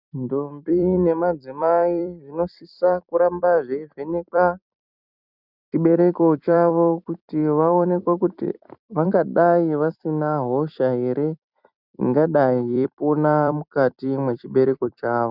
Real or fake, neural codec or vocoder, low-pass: fake; vocoder, 44.1 kHz, 128 mel bands every 256 samples, BigVGAN v2; 5.4 kHz